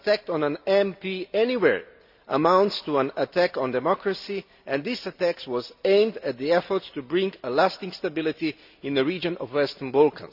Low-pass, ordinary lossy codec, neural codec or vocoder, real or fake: 5.4 kHz; none; none; real